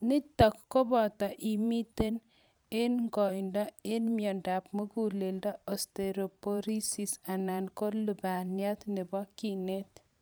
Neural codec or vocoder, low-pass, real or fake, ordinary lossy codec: vocoder, 44.1 kHz, 128 mel bands every 512 samples, BigVGAN v2; none; fake; none